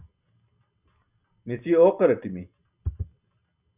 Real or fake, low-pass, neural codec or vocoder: real; 3.6 kHz; none